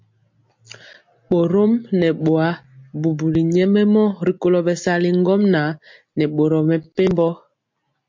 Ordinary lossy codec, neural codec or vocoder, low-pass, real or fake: MP3, 48 kbps; none; 7.2 kHz; real